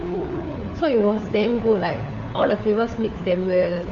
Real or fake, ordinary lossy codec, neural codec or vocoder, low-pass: fake; AAC, 64 kbps; codec, 16 kHz, 4 kbps, FunCodec, trained on LibriTTS, 50 frames a second; 7.2 kHz